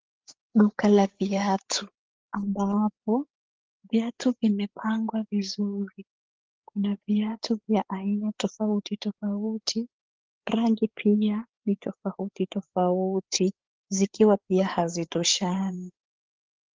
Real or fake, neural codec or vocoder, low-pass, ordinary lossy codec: fake; codec, 16 kHz, 8 kbps, FreqCodec, larger model; 7.2 kHz; Opus, 16 kbps